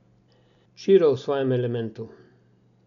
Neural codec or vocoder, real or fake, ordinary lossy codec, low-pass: none; real; none; 7.2 kHz